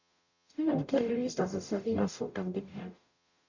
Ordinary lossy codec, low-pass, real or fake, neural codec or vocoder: none; 7.2 kHz; fake; codec, 44.1 kHz, 0.9 kbps, DAC